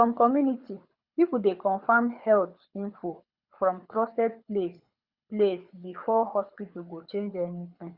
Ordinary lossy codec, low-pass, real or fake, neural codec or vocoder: Opus, 64 kbps; 5.4 kHz; fake; codec, 24 kHz, 6 kbps, HILCodec